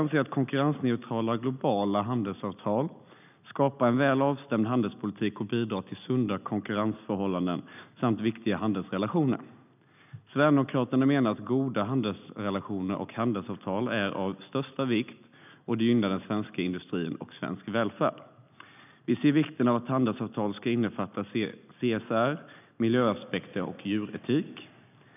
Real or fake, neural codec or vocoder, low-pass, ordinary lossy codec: real; none; 3.6 kHz; none